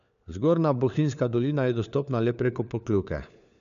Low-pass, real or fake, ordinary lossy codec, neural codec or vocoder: 7.2 kHz; fake; none; codec, 16 kHz, 4 kbps, FunCodec, trained on LibriTTS, 50 frames a second